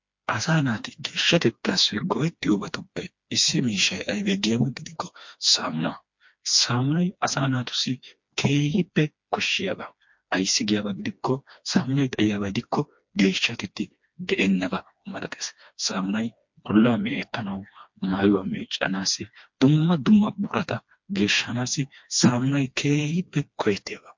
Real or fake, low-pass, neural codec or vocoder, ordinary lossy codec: fake; 7.2 kHz; codec, 16 kHz, 2 kbps, FreqCodec, smaller model; MP3, 48 kbps